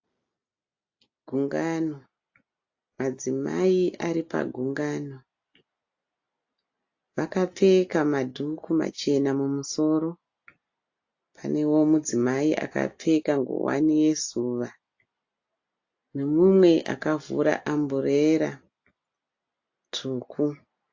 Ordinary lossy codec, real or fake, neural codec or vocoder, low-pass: AAC, 48 kbps; real; none; 7.2 kHz